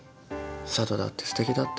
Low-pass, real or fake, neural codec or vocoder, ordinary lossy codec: none; real; none; none